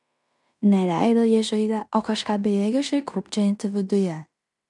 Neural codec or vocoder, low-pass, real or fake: codec, 16 kHz in and 24 kHz out, 0.9 kbps, LongCat-Audio-Codec, fine tuned four codebook decoder; 10.8 kHz; fake